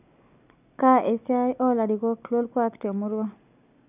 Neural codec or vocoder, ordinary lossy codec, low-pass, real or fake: none; none; 3.6 kHz; real